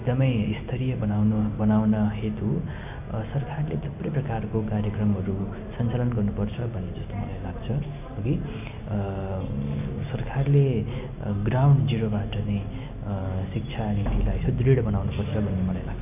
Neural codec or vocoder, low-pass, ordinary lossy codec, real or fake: none; 3.6 kHz; AAC, 32 kbps; real